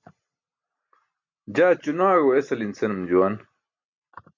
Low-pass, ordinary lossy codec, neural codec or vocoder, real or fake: 7.2 kHz; MP3, 64 kbps; none; real